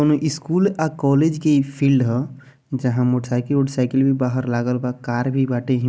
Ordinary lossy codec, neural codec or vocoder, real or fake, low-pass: none; none; real; none